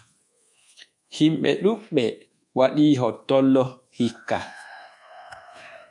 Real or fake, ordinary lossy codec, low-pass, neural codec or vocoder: fake; MP3, 96 kbps; 10.8 kHz; codec, 24 kHz, 1.2 kbps, DualCodec